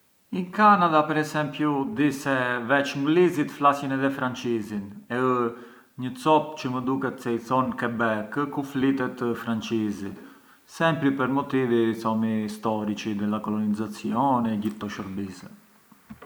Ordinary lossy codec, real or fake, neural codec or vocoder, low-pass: none; real; none; none